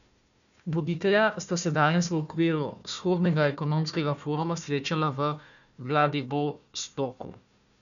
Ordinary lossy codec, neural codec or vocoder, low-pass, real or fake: none; codec, 16 kHz, 1 kbps, FunCodec, trained on Chinese and English, 50 frames a second; 7.2 kHz; fake